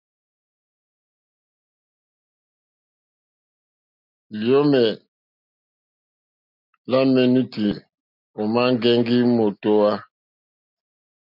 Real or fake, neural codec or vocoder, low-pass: real; none; 5.4 kHz